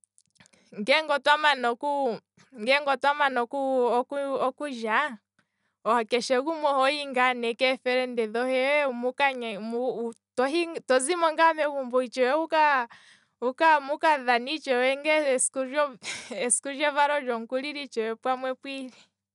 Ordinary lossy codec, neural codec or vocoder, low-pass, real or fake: none; none; 10.8 kHz; real